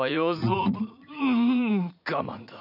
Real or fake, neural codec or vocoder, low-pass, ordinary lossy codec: fake; vocoder, 44.1 kHz, 80 mel bands, Vocos; 5.4 kHz; none